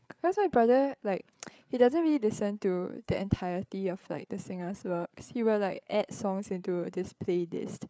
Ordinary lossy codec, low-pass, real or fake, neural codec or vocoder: none; none; fake; codec, 16 kHz, 8 kbps, FreqCodec, larger model